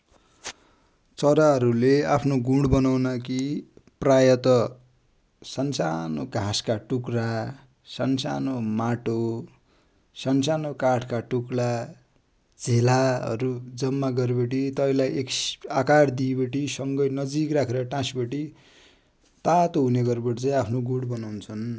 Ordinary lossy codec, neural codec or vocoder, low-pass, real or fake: none; none; none; real